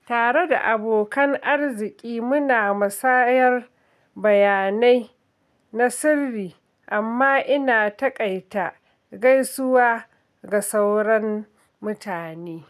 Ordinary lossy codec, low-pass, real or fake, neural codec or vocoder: none; 14.4 kHz; real; none